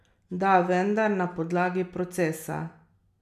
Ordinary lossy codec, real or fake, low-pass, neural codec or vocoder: none; real; 14.4 kHz; none